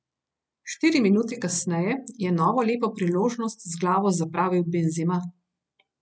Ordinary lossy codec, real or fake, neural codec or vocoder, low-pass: none; real; none; none